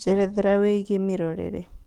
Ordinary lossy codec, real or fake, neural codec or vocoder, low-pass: Opus, 32 kbps; fake; codec, 44.1 kHz, 7.8 kbps, DAC; 19.8 kHz